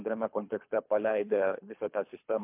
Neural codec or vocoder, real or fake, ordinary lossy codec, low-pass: codec, 24 kHz, 3 kbps, HILCodec; fake; MP3, 32 kbps; 3.6 kHz